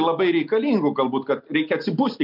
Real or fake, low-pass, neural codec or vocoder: real; 5.4 kHz; none